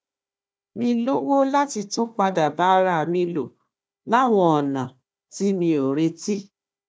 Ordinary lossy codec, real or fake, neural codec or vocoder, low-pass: none; fake; codec, 16 kHz, 1 kbps, FunCodec, trained on Chinese and English, 50 frames a second; none